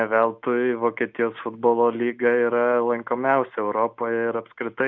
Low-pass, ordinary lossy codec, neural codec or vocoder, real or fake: 7.2 kHz; Opus, 64 kbps; none; real